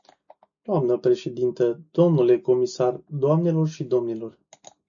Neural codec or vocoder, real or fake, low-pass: none; real; 7.2 kHz